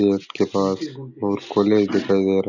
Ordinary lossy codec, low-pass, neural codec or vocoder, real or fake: none; 7.2 kHz; none; real